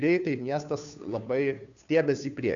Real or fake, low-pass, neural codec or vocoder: fake; 7.2 kHz; codec, 16 kHz, 2 kbps, FunCodec, trained on Chinese and English, 25 frames a second